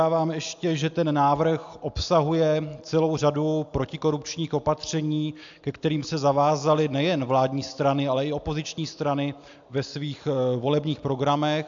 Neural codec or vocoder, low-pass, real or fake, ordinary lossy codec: none; 7.2 kHz; real; AAC, 64 kbps